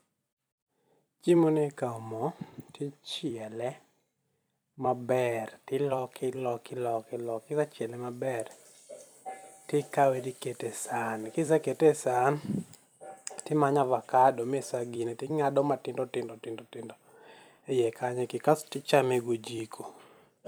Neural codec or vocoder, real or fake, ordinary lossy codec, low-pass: vocoder, 44.1 kHz, 128 mel bands every 256 samples, BigVGAN v2; fake; none; none